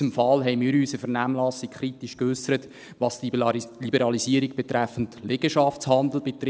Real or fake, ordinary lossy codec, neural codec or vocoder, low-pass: real; none; none; none